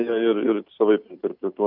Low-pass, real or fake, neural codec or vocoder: 5.4 kHz; real; none